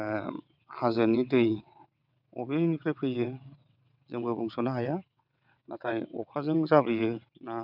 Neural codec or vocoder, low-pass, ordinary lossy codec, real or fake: vocoder, 22.05 kHz, 80 mel bands, WaveNeXt; 5.4 kHz; none; fake